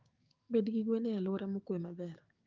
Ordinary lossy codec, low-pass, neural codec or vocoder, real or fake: Opus, 24 kbps; 7.2 kHz; codec, 16 kHz, 6 kbps, DAC; fake